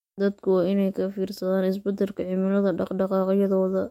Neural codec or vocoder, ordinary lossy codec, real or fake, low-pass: autoencoder, 48 kHz, 128 numbers a frame, DAC-VAE, trained on Japanese speech; MP3, 64 kbps; fake; 19.8 kHz